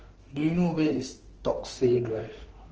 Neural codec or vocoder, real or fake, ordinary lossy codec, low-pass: autoencoder, 48 kHz, 32 numbers a frame, DAC-VAE, trained on Japanese speech; fake; Opus, 16 kbps; 7.2 kHz